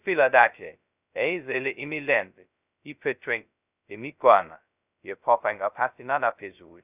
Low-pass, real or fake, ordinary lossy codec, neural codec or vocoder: 3.6 kHz; fake; none; codec, 16 kHz, 0.2 kbps, FocalCodec